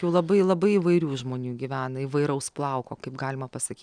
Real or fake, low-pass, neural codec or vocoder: real; 9.9 kHz; none